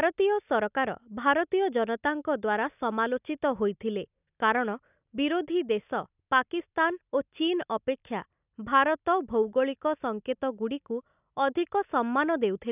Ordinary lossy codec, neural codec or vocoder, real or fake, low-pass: none; none; real; 3.6 kHz